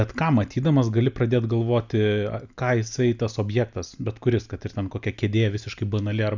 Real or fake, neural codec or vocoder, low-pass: real; none; 7.2 kHz